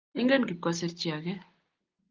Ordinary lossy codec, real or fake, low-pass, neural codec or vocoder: Opus, 24 kbps; real; 7.2 kHz; none